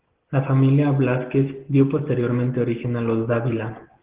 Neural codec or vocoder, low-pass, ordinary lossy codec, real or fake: none; 3.6 kHz; Opus, 16 kbps; real